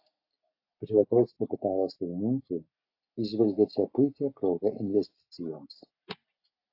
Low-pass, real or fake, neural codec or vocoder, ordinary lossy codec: 5.4 kHz; real; none; AAC, 32 kbps